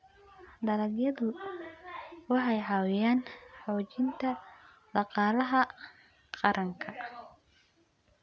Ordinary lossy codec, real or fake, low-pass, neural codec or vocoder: none; real; none; none